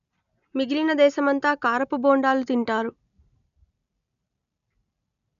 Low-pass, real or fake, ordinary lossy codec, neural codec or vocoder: 7.2 kHz; real; none; none